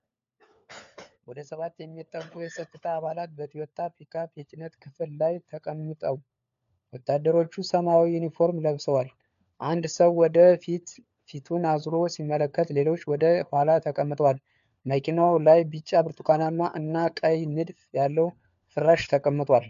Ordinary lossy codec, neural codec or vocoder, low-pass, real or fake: MP3, 64 kbps; codec, 16 kHz, 4 kbps, FunCodec, trained on LibriTTS, 50 frames a second; 7.2 kHz; fake